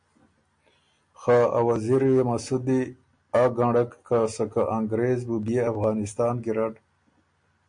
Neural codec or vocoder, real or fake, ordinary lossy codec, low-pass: none; real; MP3, 48 kbps; 9.9 kHz